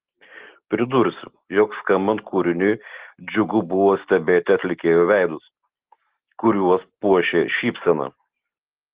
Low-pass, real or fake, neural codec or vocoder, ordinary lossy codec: 3.6 kHz; real; none; Opus, 16 kbps